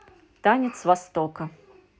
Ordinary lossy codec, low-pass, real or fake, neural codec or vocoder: none; none; real; none